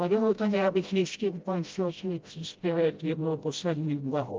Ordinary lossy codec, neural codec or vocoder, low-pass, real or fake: Opus, 24 kbps; codec, 16 kHz, 0.5 kbps, FreqCodec, smaller model; 7.2 kHz; fake